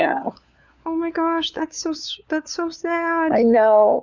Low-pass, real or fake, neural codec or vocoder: 7.2 kHz; fake; codec, 16 kHz, 4 kbps, FunCodec, trained on LibriTTS, 50 frames a second